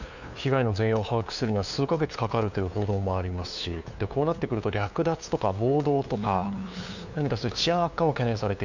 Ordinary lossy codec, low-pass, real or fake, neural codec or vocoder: none; 7.2 kHz; fake; codec, 16 kHz, 2 kbps, FunCodec, trained on LibriTTS, 25 frames a second